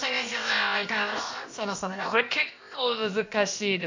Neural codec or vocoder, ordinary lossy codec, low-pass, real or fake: codec, 16 kHz, about 1 kbps, DyCAST, with the encoder's durations; MP3, 48 kbps; 7.2 kHz; fake